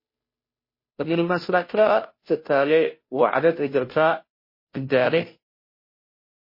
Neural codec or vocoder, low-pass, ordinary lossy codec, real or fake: codec, 16 kHz, 0.5 kbps, FunCodec, trained on Chinese and English, 25 frames a second; 5.4 kHz; MP3, 24 kbps; fake